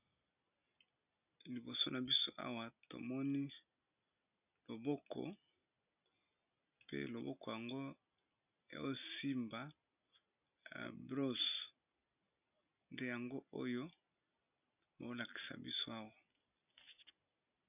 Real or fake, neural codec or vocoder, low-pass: real; none; 3.6 kHz